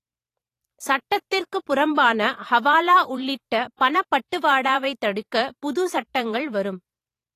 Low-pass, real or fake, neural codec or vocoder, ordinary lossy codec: 14.4 kHz; fake; vocoder, 44.1 kHz, 128 mel bands every 512 samples, BigVGAN v2; AAC, 48 kbps